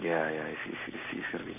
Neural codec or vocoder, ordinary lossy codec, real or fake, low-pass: codec, 44.1 kHz, 7.8 kbps, Pupu-Codec; none; fake; 3.6 kHz